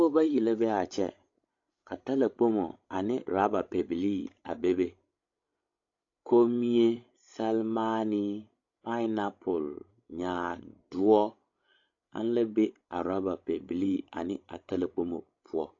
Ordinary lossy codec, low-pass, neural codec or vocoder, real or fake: AAC, 48 kbps; 7.2 kHz; codec, 16 kHz, 16 kbps, FreqCodec, larger model; fake